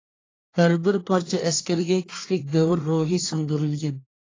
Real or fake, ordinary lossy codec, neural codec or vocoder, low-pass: fake; AAC, 32 kbps; codec, 24 kHz, 1 kbps, SNAC; 7.2 kHz